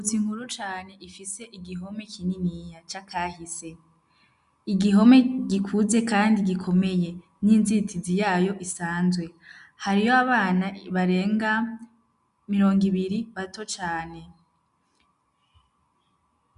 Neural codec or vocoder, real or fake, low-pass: none; real; 10.8 kHz